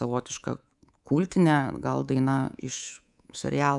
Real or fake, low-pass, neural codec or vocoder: fake; 10.8 kHz; codec, 24 kHz, 3.1 kbps, DualCodec